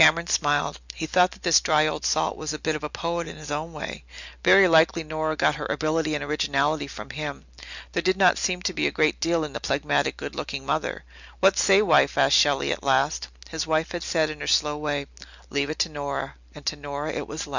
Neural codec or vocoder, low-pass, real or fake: none; 7.2 kHz; real